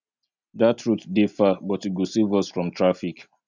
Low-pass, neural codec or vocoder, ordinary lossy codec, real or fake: 7.2 kHz; none; none; real